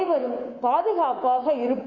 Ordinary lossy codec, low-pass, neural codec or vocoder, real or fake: none; 7.2 kHz; codec, 24 kHz, 3.1 kbps, DualCodec; fake